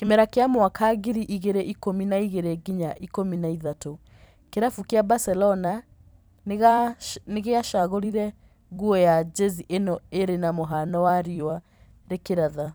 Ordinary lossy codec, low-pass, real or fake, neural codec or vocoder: none; none; fake; vocoder, 44.1 kHz, 128 mel bands every 256 samples, BigVGAN v2